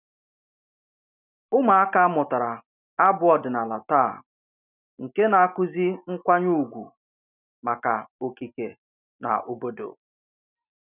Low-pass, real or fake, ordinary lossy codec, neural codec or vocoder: 3.6 kHz; real; none; none